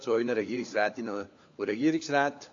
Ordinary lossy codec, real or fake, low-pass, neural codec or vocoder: AAC, 48 kbps; fake; 7.2 kHz; codec, 16 kHz, 4 kbps, FunCodec, trained on LibriTTS, 50 frames a second